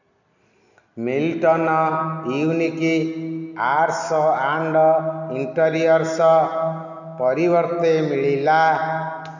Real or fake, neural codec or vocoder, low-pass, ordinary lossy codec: real; none; 7.2 kHz; none